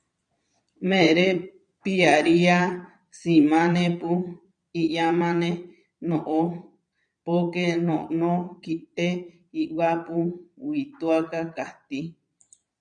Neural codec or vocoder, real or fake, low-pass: vocoder, 22.05 kHz, 80 mel bands, Vocos; fake; 9.9 kHz